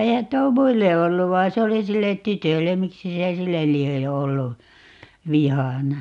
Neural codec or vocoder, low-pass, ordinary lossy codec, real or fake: none; 10.8 kHz; none; real